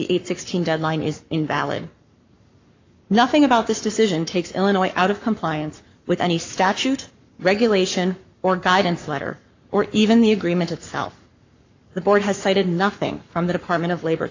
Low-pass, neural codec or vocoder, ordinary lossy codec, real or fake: 7.2 kHz; codec, 44.1 kHz, 7.8 kbps, Pupu-Codec; AAC, 48 kbps; fake